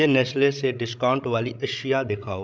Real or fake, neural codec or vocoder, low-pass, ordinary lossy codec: fake; codec, 16 kHz, 16 kbps, FreqCodec, larger model; none; none